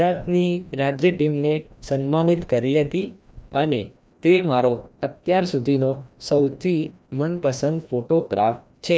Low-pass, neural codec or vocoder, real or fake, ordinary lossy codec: none; codec, 16 kHz, 1 kbps, FreqCodec, larger model; fake; none